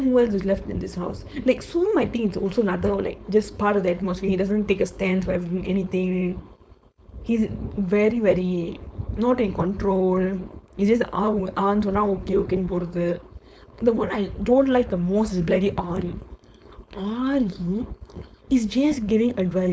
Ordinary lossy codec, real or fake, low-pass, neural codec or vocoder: none; fake; none; codec, 16 kHz, 4.8 kbps, FACodec